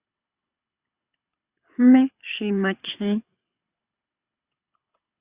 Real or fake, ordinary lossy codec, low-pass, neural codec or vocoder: fake; Opus, 64 kbps; 3.6 kHz; codec, 24 kHz, 6 kbps, HILCodec